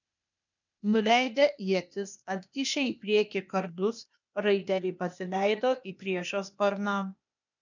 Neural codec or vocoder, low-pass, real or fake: codec, 16 kHz, 0.8 kbps, ZipCodec; 7.2 kHz; fake